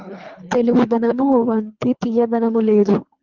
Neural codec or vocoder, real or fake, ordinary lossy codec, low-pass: codec, 24 kHz, 3 kbps, HILCodec; fake; Opus, 32 kbps; 7.2 kHz